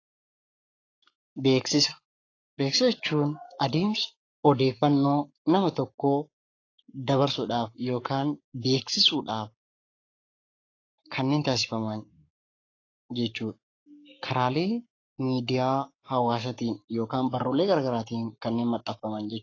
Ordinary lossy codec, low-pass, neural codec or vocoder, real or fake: AAC, 32 kbps; 7.2 kHz; codec, 44.1 kHz, 7.8 kbps, Pupu-Codec; fake